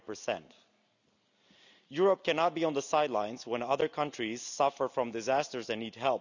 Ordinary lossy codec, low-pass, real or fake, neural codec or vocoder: none; 7.2 kHz; real; none